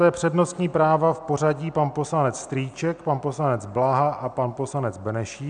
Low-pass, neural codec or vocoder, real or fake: 9.9 kHz; none; real